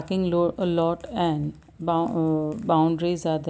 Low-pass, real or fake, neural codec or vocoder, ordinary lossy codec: none; real; none; none